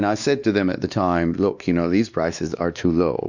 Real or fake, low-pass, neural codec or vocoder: fake; 7.2 kHz; codec, 16 kHz, 2 kbps, X-Codec, WavLM features, trained on Multilingual LibriSpeech